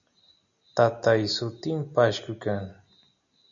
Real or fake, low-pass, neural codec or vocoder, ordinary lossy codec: real; 7.2 kHz; none; MP3, 96 kbps